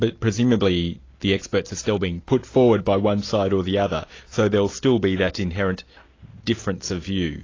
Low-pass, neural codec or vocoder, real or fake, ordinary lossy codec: 7.2 kHz; none; real; AAC, 32 kbps